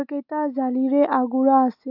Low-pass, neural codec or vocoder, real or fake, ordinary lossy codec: 5.4 kHz; none; real; none